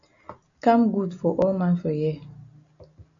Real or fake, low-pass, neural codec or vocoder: real; 7.2 kHz; none